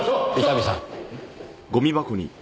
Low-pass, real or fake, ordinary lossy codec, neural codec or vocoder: none; real; none; none